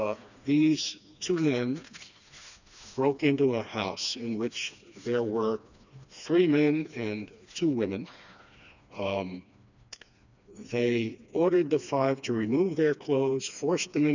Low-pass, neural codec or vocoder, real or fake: 7.2 kHz; codec, 16 kHz, 2 kbps, FreqCodec, smaller model; fake